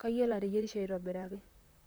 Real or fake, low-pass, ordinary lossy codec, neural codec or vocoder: fake; none; none; vocoder, 44.1 kHz, 128 mel bands, Pupu-Vocoder